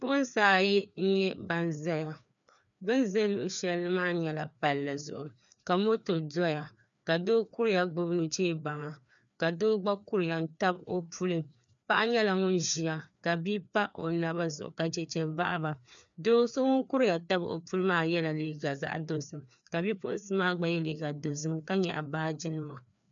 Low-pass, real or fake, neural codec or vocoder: 7.2 kHz; fake; codec, 16 kHz, 2 kbps, FreqCodec, larger model